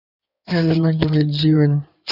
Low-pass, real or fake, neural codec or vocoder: 5.4 kHz; fake; codec, 16 kHz in and 24 kHz out, 2.2 kbps, FireRedTTS-2 codec